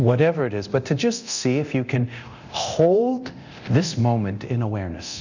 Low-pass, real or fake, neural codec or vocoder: 7.2 kHz; fake; codec, 24 kHz, 0.9 kbps, DualCodec